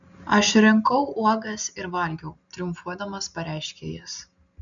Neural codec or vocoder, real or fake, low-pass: none; real; 7.2 kHz